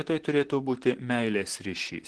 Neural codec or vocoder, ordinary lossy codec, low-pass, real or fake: vocoder, 44.1 kHz, 128 mel bands, Pupu-Vocoder; Opus, 16 kbps; 10.8 kHz; fake